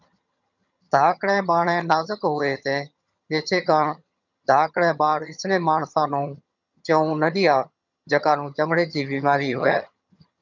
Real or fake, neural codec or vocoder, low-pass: fake; vocoder, 22.05 kHz, 80 mel bands, HiFi-GAN; 7.2 kHz